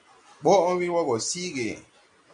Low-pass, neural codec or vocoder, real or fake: 9.9 kHz; none; real